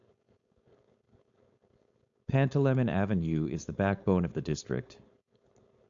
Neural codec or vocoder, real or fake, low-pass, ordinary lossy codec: codec, 16 kHz, 4.8 kbps, FACodec; fake; 7.2 kHz; AAC, 64 kbps